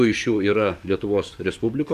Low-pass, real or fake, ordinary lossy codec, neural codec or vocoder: 14.4 kHz; fake; MP3, 96 kbps; codec, 44.1 kHz, 7.8 kbps, DAC